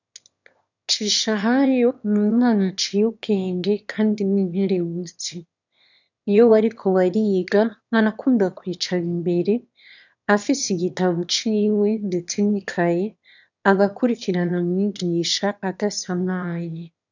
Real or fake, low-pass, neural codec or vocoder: fake; 7.2 kHz; autoencoder, 22.05 kHz, a latent of 192 numbers a frame, VITS, trained on one speaker